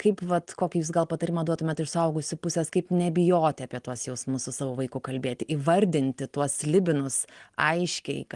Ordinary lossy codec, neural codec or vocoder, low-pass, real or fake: Opus, 24 kbps; none; 10.8 kHz; real